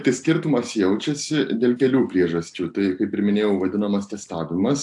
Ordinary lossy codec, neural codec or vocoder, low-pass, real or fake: AAC, 64 kbps; none; 10.8 kHz; real